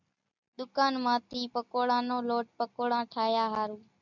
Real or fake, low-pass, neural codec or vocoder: real; 7.2 kHz; none